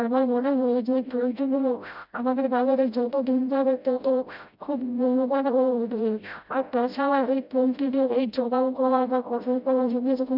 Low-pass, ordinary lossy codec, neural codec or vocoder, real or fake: 5.4 kHz; none; codec, 16 kHz, 0.5 kbps, FreqCodec, smaller model; fake